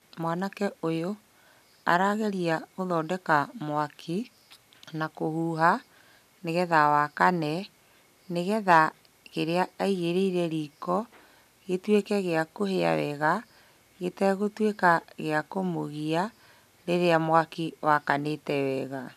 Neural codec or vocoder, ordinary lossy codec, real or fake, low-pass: none; none; real; 14.4 kHz